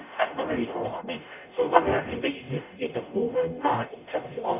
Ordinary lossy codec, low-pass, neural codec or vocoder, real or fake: none; 3.6 kHz; codec, 44.1 kHz, 0.9 kbps, DAC; fake